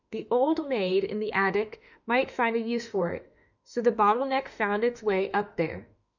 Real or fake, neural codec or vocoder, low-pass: fake; autoencoder, 48 kHz, 32 numbers a frame, DAC-VAE, trained on Japanese speech; 7.2 kHz